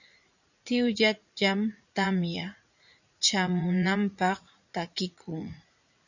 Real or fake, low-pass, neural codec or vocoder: fake; 7.2 kHz; vocoder, 44.1 kHz, 80 mel bands, Vocos